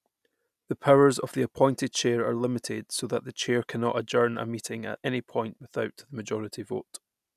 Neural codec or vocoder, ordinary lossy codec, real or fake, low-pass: none; none; real; 14.4 kHz